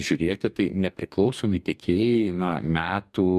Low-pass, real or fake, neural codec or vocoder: 14.4 kHz; fake; codec, 44.1 kHz, 2.6 kbps, DAC